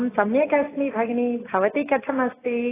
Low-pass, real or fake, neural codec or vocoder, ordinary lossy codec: 3.6 kHz; real; none; AAC, 16 kbps